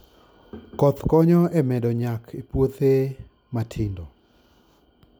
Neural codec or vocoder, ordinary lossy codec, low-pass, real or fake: none; none; none; real